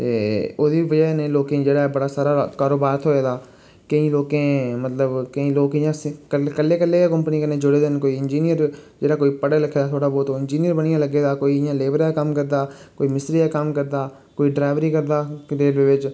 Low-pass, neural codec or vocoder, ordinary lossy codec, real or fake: none; none; none; real